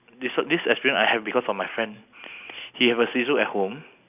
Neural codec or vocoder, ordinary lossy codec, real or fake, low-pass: none; none; real; 3.6 kHz